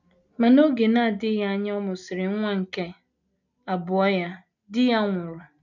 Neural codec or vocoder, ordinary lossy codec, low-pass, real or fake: none; none; 7.2 kHz; real